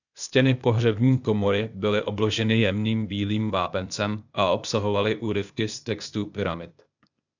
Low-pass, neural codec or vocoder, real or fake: 7.2 kHz; codec, 16 kHz, 0.8 kbps, ZipCodec; fake